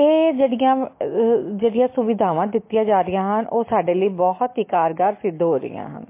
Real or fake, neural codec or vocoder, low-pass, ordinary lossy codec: real; none; 3.6 kHz; MP3, 24 kbps